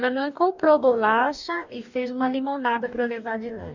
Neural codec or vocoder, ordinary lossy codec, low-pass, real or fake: codec, 44.1 kHz, 2.6 kbps, DAC; none; 7.2 kHz; fake